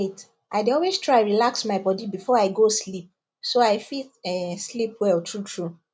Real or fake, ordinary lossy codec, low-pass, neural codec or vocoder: real; none; none; none